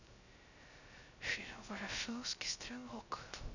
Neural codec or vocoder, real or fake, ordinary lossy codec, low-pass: codec, 16 kHz, 0.3 kbps, FocalCodec; fake; none; 7.2 kHz